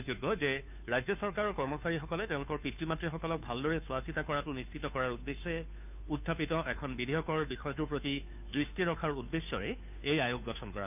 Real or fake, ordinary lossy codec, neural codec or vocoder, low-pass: fake; none; codec, 16 kHz, 2 kbps, FunCodec, trained on Chinese and English, 25 frames a second; 3.6 kHz